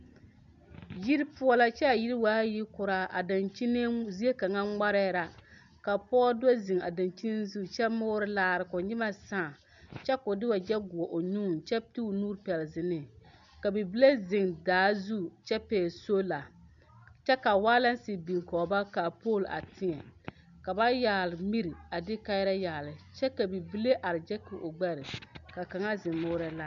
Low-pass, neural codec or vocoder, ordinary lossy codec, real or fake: 7.2 kHz; none; MP3, 64 kbps; real